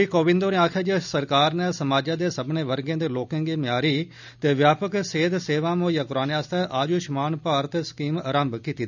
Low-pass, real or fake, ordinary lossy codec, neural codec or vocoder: 7.2 kHz; real; none; none